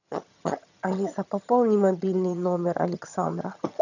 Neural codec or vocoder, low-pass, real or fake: vocoder, 22.05 kHz, 80 mel bands, HiFi-GAN; 7.2 kHz; fake